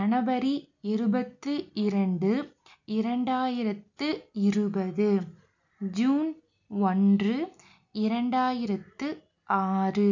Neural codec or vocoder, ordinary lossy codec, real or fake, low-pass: none; none; real; 7.2 kHz